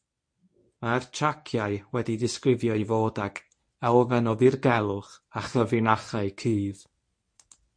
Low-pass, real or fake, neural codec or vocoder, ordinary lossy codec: 9.9 kHz; fake; codec, 24 kHz, 0.9 kbps, WavTokenizer, medium speech release version 1; MP3, 48 kbps